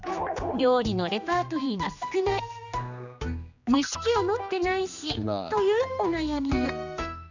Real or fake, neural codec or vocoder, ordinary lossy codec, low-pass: fake; codec, 16 kHz, 2 kbps, X-Codec, HuBERT features, trained on general audio; none; 7.2 kHz